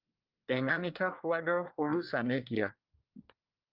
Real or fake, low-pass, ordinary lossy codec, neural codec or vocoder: fake; 5.4 kHz; Opus, 24 kbps; codec, 24 kHz, 1 kbps, SNAC